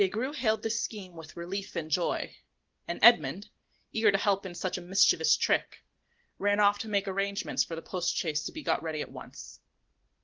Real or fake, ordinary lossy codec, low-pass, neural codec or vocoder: real; Opus, 16 kbps; 7.2 kHz; none